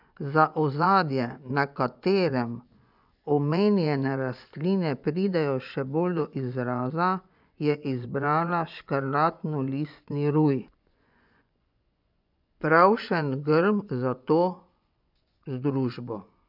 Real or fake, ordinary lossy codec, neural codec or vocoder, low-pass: fake; none; vocoder, 24 kHz, 100 mel bands, Vocos; 5.4 kHz